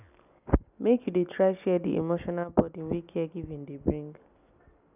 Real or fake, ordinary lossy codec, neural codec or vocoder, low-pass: real; none; none; 3.6 kHz